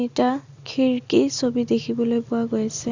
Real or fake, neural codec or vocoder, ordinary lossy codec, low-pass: real; none; Opus, 64 kbps; 7.2 kHz